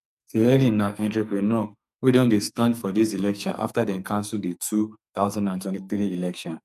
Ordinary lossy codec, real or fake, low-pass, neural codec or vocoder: none; fake; 14.4 kHz; codec, 44.1 kHz, 2.6 kbps, SNAC